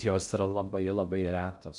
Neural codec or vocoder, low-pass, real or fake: codec, 16 kHz in and 24 kHz out, 0.6 kbps, FocalCodec, streaming, 2048 codes; 10.8 kHz; fake